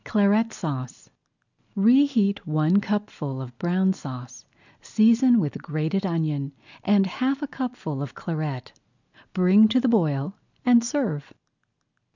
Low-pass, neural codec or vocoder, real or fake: 7.2 kHz; none; real